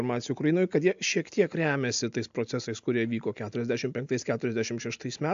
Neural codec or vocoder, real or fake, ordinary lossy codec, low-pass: none; real; AAC, 64 kbps; 7.2 kHz